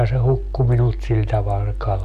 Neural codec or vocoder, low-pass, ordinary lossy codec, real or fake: none; 14.4 kHz; none; real